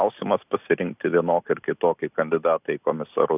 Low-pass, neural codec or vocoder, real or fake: 3.6 kHz; none; real